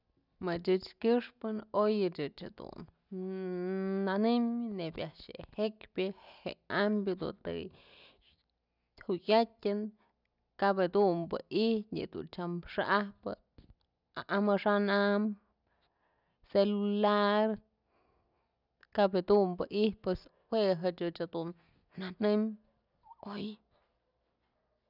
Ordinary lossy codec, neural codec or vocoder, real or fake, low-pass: none; none; real; 5.4 kHz